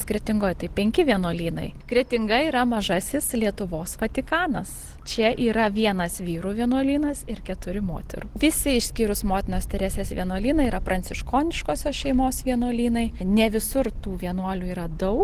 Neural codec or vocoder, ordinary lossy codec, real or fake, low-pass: none; Opus, 16 kbps; real; 14.4 kHz